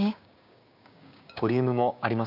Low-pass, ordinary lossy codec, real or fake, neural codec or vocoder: 5.4 kHz; none; fake; codec, 16 kHz, 6 kbps, DAC